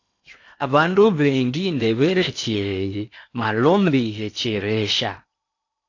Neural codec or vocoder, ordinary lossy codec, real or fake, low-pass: codec, 16 kHz in and 24 kHz out, 0.8 kbps, FocalCodec, streaming, 65536 codes; AAC, 48 kbps; fake; 7.2 kHz